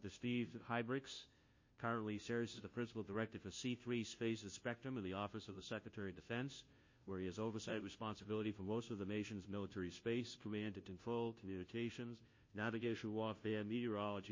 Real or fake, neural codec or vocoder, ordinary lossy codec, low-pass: fake; codec, 16 kHz, 0.5 kbps, FunCodec, trained on Chinese and English, 25 frames a second; MP3, 32 kbps; 7.2 kHz